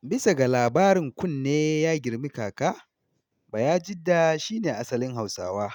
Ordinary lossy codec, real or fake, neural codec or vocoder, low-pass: none; real; none; 19.8 kHz